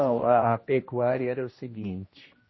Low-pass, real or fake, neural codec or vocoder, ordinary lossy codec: 7.2 kHz; fake; codec, 16 kHz, 1 kbps, X-Codec, HuBERT features, trained on general audio; MP3, 24 kbps